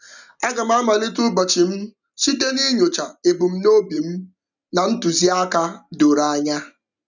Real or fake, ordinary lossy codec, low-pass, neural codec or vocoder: real; none; 7.2 kHz; none